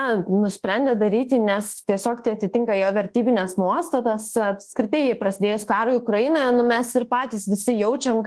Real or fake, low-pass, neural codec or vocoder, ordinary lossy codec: fake; 10.8 kHz; codec, 24 kHz, 1.2 kbps, DualCodec; Opus, 16 kbps